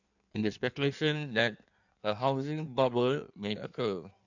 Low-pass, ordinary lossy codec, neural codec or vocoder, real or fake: 7.2 kHz; none; codec, 16 kHz in and 24 kHz out, 1.1 kbps, FireRedTTS-2 codec; fake